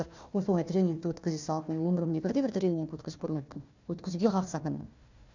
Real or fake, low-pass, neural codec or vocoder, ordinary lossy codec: fake; 7.2 kHz; codec, 16 kHz, 1 kbps, FunCodec, trained on Chinese and English, 50 frames a second; none